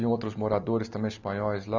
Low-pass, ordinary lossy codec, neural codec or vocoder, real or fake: 7.2 kHz; none; none; real